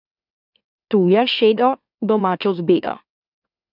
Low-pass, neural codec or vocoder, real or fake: 5.4 kHz; autoencoder, 44.1 kHz, a latent of 192 numbers a frame, MeloTTS; fake